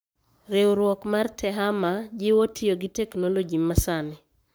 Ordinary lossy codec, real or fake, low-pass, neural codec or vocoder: none; fake; none; codec, 44.1 kHz, 7.8 kbps, Pupu-Codec